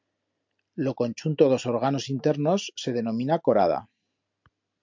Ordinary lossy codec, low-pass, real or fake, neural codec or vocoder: MP3, 64 kbps; 7.2 kHz; real; none